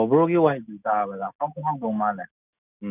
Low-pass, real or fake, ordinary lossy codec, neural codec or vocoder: 3.6 kHz; real; none; none